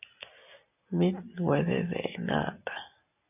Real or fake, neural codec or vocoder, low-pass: real; none; 3.6 kHz